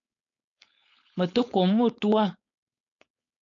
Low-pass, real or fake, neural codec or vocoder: 7.2 kHz; fake; codec, 16 kHz, 4.8 kbps, FACodec